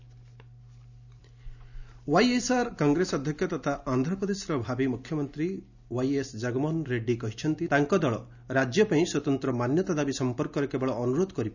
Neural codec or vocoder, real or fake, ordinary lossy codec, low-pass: none; real; none; 7.2 kHz